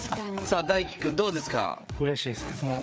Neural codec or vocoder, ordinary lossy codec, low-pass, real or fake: codec, 16 kHz, 4 kbps, FreqCodec, larger model; none; none; fake